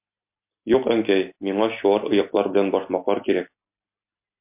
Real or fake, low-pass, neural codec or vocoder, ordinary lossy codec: real; 3.6 kHz; none; MP3, 32 kbps